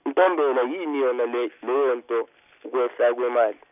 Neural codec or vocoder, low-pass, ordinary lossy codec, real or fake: none; 3.6 kHz; none; real